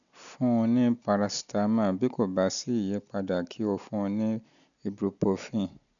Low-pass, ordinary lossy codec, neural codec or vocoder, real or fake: 7.2 kHz; none; none; real